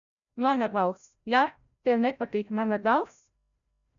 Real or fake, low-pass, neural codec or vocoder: fake; 7.2 kHz; codec, 16 kHz, 0.5 kbps, FreqCodec, larger model